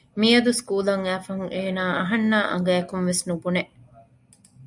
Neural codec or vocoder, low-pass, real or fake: none; 10.8 kHz; real